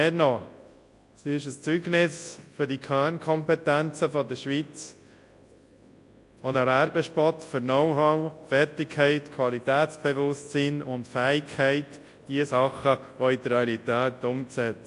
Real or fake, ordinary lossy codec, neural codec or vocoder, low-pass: fake; AAC, 48 kbps; codec, 24 kHz, 0.9 kbps, WavTokenizer, large speech release; 10.8 kHz